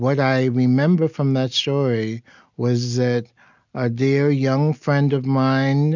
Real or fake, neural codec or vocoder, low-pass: real; none; 7.2 kHz